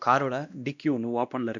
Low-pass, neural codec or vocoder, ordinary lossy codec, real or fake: 7.2 kHz; codec, 16 kHz, 1 kbps, X-Codec, WavLM features, trained on Multilingual LibriSpeech; none; fake